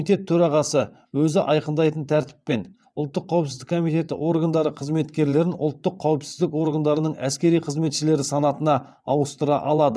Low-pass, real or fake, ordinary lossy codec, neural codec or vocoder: none; fake; none; vocoder, 22.05 kHz, 80 mel bands, WaveNeXt